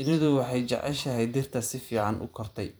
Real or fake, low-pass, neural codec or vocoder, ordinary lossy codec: fake; none; vocoder, 44.1 kHz, 128 mel bands every 512 samples, BigVGAN v2; none